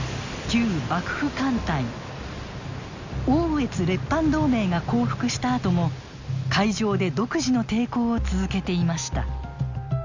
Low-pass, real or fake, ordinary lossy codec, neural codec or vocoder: 7.2 kHz; real; Opus, 64 kbps; none